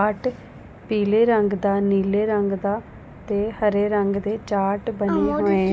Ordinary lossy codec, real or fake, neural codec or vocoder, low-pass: none; real; none; none